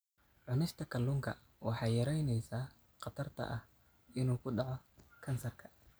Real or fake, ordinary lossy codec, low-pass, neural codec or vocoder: real; none; none; none